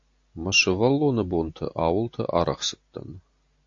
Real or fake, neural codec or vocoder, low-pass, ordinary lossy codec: real; none; 7.2 kHz; AAC, 48 kbps